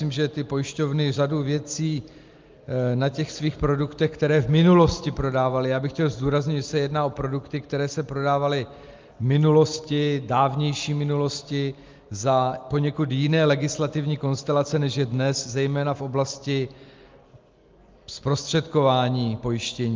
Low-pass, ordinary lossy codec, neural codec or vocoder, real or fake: 7.2 kHz; Opus, 32 kbps; none; real